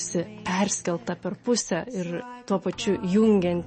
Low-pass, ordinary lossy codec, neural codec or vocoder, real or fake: 9.9 kHz; MP3, 32 kbps; none; real